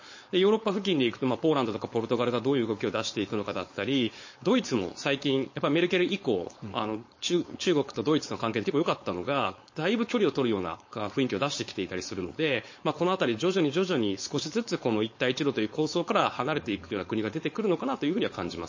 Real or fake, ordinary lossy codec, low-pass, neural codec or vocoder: fake; MP3, 32 kbps; 7.2 kHz; codec, 16 kHz, 4.8 kbps, FACodec